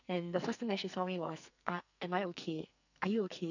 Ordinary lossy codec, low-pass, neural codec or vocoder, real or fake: none; 7.2 kHz; codec, 44.1 kHz, 2.6 kbps, SNAC; fake